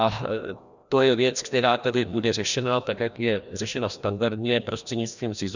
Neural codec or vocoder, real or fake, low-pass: codec, 16 kHz, 1 kbps, FreqCodec, larger model; fake; 7.2 kHz